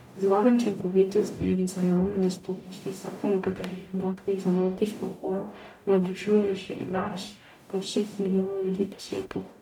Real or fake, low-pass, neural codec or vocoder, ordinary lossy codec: fake; 19.8 kHz; codec, 44.1 kHz, 0.9 kbps, DAC; none